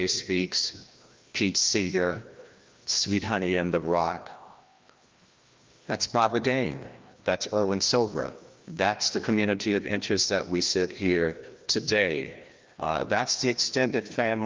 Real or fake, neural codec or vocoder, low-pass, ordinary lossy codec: fake; codec, 16 kHz, 1 kbps, FreqCodec, larger model; 7.2 kHz; Opus, 32 kbps